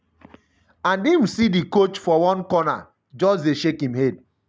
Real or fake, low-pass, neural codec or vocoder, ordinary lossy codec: real; none; none; none